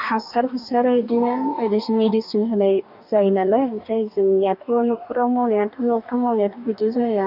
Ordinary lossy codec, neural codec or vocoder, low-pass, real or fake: none; codec, 44.1 kHz, 2.6 kbps, DAC; 5.4 kHz; fake